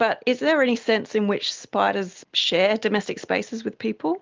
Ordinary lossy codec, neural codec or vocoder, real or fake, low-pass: Opus, 24 kbps; none; real; 7.2 kHz